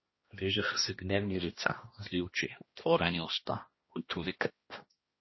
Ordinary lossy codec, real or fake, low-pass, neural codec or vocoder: MP3, 24 kbps; fake; 7.2 kHz; codec, 16 kHz, 1 kbps, X-Codec, HuBERT features, trained on balanced general audio